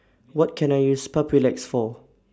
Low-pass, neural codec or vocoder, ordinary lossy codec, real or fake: none; none; none; real